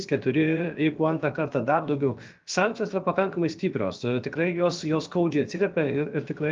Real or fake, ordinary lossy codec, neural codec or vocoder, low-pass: fake; Opus, 32 kbps; codec, 16 kHz, about 1 kbps, DyCAST, with the encoder's durations; 7.2 kHz